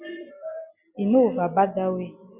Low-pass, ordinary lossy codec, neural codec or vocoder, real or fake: 3.6 kHz; Opus, 64 kbps; none; real